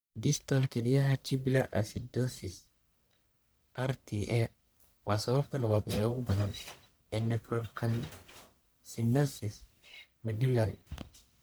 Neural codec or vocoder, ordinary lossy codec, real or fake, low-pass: codec, 44.1 kHz, 1.7 kbps, Pupu-Codec; none; fake; none